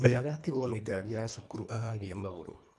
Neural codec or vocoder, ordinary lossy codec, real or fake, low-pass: codec, 24 kHz, 1.5 kbps, HILCodec; none; fake; none